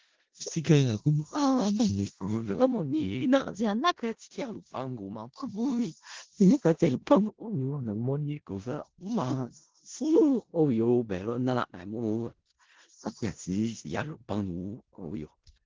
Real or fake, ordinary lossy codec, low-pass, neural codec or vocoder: fake; Opus, 16 kbps; 7.2 kHz; codec, 16 kHz in and 24 kHz out, 0.4 kbps, LongCat-Audio-Codec, four codebook decoder